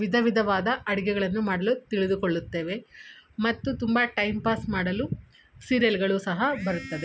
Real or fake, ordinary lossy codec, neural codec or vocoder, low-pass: real; none; none; none